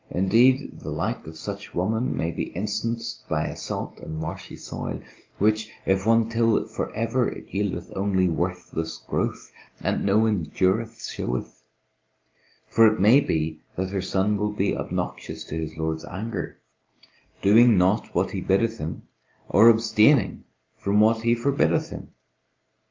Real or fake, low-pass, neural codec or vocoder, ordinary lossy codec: real; 7.2 kHz; none; Opus, 32 kbps